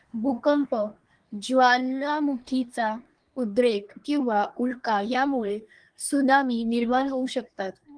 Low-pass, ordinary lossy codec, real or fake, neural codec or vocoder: 9.9 kHz; Opus, 24 kbps; fake; codec, 24 kHz, 1 kbps, SNAC